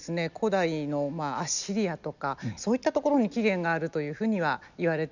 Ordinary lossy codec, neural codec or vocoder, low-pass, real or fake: none; none; 7.2 kHz; real